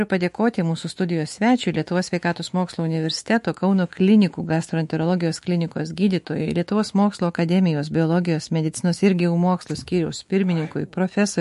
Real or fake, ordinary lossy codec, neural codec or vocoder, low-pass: fake; MP3, 48 kbps; autoencoder, 48 kHz, 128 numbers a frame, DAC-VAE, trained on Japanese speech; 14.4 kHz